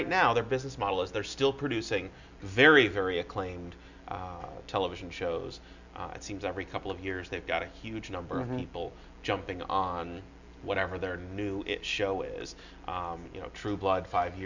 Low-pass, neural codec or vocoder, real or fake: 7.2 kHz; none; real